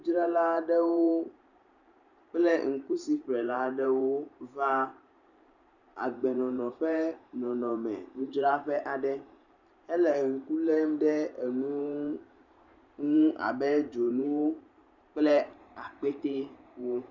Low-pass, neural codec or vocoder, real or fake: 7.2 kHz; vocoder, 44.1 kHz, 128 mel bands every 512 samples, BigVGAN v2; fake